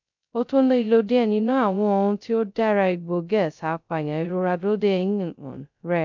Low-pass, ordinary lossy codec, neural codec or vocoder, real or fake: 7.2 kHz; none; codec, 16 kHz, 0.2 kbps, FocalCodec; fake